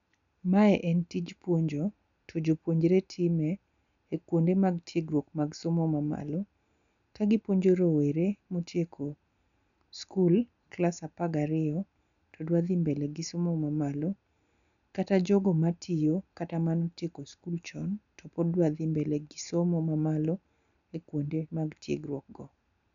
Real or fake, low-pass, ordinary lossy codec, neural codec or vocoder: real; 7.2 kHz; none; none